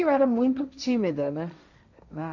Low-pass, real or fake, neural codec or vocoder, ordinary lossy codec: 7.2 kHz; fake; codec, 16 kHz, 1.1 kbps, Voila-Tokenizer; none